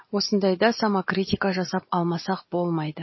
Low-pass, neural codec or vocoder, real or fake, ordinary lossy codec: 7.2 kHz; none; real; MP3, 24 kbps